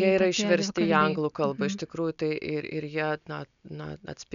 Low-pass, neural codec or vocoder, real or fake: 7.2 kHz; none; real